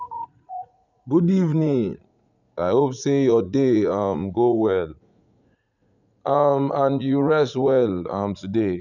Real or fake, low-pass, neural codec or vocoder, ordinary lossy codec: fake; 7.2 kHz; vocoder, 44.1 kHz, 80 mel bands, Vocos; none